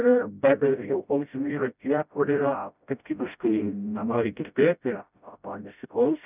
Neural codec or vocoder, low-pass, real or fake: codec, 16 kHz, 0.5 kbps, FreqCodec, smaller model; 3.6 kHz; fake